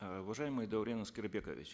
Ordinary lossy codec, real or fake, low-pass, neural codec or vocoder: none; real; none; none